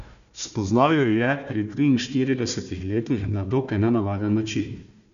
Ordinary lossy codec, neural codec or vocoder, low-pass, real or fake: none; codec, 16 kHz, 1 kbps, FunCodec, trained on Chinese and English, 50 frames a second; 7.2 kHz; fake